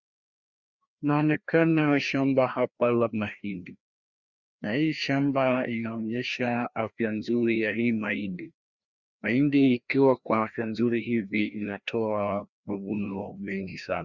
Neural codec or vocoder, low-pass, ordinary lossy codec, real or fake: codec, 16 kHz, 1 kbps, FreqCodec, larger model; 7.2 kHz; Opus, 64 kbps; fake